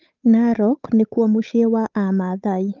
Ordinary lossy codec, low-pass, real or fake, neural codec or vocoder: Opus, 32 kbps; 7.2 kHz; fake; codec, 16 kHz, 8 kbps, FunCodec, trained on Chinese and English, 25 frames a second